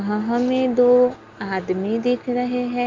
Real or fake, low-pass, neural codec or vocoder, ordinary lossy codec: real; 7.2 kHz; none; Opus, 32 kbps